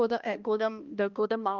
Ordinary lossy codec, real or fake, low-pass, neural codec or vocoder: Opus, 24 kbps; fake; 7.2 kHz; codec, 16 kHz, 1 kbps, X-Codec, HuBERT features, trained on balanced general audio